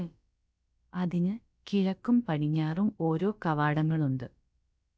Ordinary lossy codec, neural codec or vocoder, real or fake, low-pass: none; codec, 16 kHz, about 1 kbps, DyCAST, with the encoder's durations; fake; none